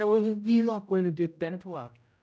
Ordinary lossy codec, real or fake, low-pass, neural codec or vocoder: none; fake; none; codec, 16 kHz, 0.5 kbps, X-Codec, HuBERT features, trained on general audio